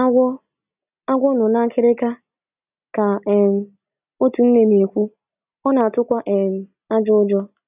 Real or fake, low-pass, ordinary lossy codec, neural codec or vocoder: real; 3.6 kHz; none; none